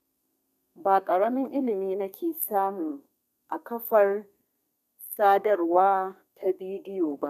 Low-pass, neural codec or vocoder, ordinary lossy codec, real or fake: 14.4 kHz; codec, 32 kHz, 1.9 kbps, SNAC; none; fake